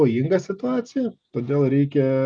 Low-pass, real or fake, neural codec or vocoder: 9.9 kHz; real; none